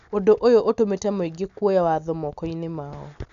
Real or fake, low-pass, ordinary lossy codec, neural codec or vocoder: real; 7.2 kHz; none; none